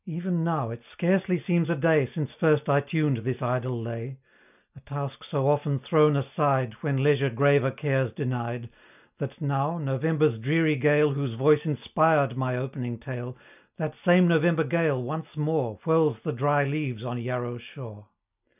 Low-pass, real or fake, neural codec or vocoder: 3.6 kHz; real; none